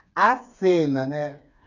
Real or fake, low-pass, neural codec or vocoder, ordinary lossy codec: fake; 7.2 kHz; codec, 16 kHz, 4 kbps, FreqCodec, smaller model; none